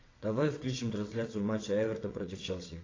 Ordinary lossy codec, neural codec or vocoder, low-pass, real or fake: AAC, 32 kbps; vocoder, 22.05 kHz, 80 mel bands, WaveNeXt; 7.2 kHz; fake